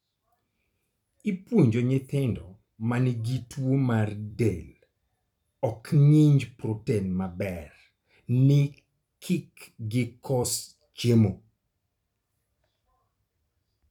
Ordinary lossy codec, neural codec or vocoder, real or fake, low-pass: none; vocoder, 44.1 kHz, 128 mel bands every 256 samples, BigVGAN v2; fake; 19.8 kHz